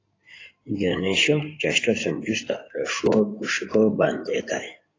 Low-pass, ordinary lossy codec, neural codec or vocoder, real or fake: 7.2 kHz; AAC, 32 kbps; codec, 16 kHz in and 24 kHz out, 2.2 kbps, FireRedTTS-2 codec; fake